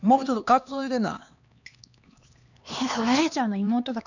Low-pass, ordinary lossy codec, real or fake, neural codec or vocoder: 7.2 kHz; none; fake; codec, 16 kHz, 2 kbps, X-Codec, HuBERT features, trained on LibriSpeech